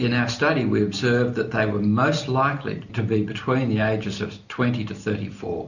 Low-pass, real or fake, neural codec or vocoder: 7.2 kHz; real; none